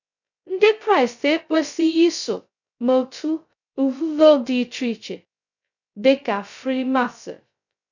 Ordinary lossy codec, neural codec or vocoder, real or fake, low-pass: none; codec, 16 kHz, 0.2 kbps, FocalCodec; fake; 7.2 kHz